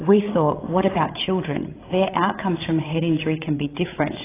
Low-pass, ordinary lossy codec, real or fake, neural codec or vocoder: 3.6 kHz; AAC, 16 kbps; fake; codec, 16 kHz, 16 kbps, FreqCodec, larger model